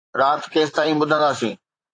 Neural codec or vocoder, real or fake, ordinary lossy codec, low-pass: vocoder, 44.1 kHz, 128 mel bands, Pupu-Vocoder; fake; AAC, 48 kbps; 9.9 kHz